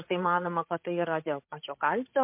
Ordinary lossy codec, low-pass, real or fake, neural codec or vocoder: MP3, 32 kbps; 3.6 kHz; fake; codec, 16 kHz, 16 kbps, FreqCodec, larger model